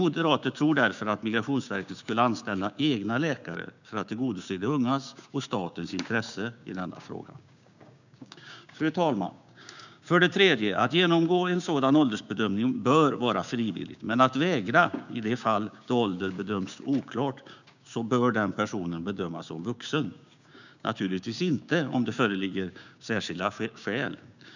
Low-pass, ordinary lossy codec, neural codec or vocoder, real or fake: 7.2 kHz; none; codec, 16 kHz, 6 kbps, DAC; fake